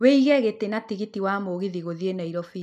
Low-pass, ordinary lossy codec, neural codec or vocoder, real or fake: 14.4 kHz; none; none; real